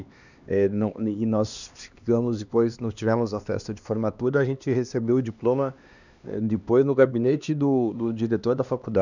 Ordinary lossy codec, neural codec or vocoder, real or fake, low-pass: none; codec, 16 kHz, 2 kbps, X-Codec, HuBERT features, trained on LibriSpeech; fake; 7.2 kHz